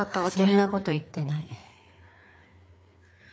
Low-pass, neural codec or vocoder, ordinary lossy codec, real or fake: none; codec, 16 kHz, 4 kbps, FreqCodec, larger model; none; fake